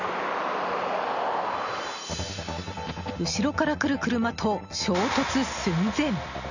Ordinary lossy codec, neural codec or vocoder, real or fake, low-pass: none; none; real; 7.2 kHz